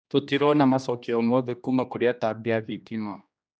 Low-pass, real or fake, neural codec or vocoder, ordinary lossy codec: none; fake; codec, 16 kHz, 1 kbps, X-Codec, HuBERT features, trained on general audio; none